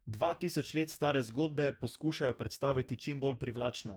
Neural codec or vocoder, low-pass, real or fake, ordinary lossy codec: codec, 44.1 kHz, 2.6 kbps, DAC; none; fake; none